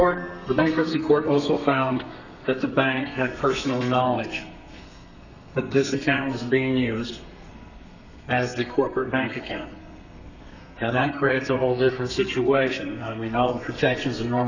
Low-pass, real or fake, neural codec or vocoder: 7.2 kHz; fake; codec, 32 kHz, 1.9 kbps, SNAC